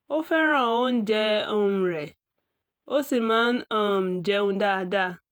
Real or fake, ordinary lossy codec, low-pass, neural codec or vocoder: fake; none; none; vocoder, 48 kHz, 128 mel bands, Vocos